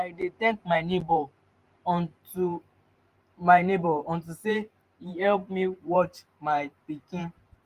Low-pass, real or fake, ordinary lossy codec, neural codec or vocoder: 14.4 kHz; fake; Opus, 32 kbps; vocoder, 44.1 kHz, 128 mel bands every 512 samples, BigVGAN v2